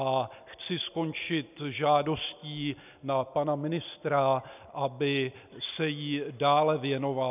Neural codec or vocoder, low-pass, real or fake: none; 3.6 kHz; real